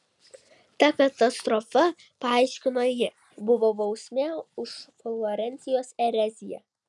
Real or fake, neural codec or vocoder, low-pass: real; none; 10.8 kHz